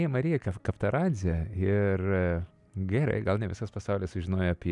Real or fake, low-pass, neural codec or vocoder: real; 10.8 kHz; none